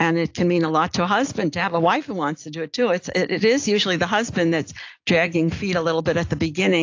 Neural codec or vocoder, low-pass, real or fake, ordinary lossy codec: none; 7.2 kHz; real; AAC, 48 kbps